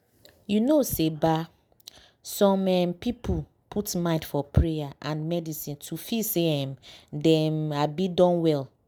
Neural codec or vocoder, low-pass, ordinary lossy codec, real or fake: none; none; none; real